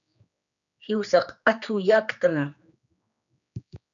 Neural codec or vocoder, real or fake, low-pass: codec, 16 kHz, 2 kbps, X-Codec, HuBERT features, trained on general audio; fake; 7.2 kHz